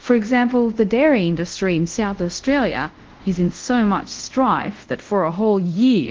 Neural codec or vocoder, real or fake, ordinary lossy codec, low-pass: codec, 24 kHz, 0.5 kbps, DualCodec; fake; Opus, 16 kbps; 7.2 kHz